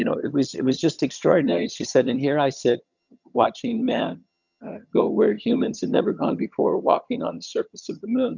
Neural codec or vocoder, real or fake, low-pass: vocoder, 22.05 kHz, 80 mel bands, HiFi-GAN; fake; 7.2 kHz